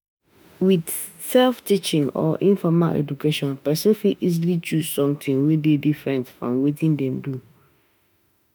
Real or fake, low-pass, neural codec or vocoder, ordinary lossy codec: fake; none; autoencoder, 48 kHz, 32 numbers a frame, DAC-VAE, trained on Japanese speech; none